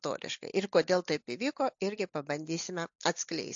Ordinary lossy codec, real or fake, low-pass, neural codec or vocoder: AAC, 64 kbps; real; 7.2 kHz; none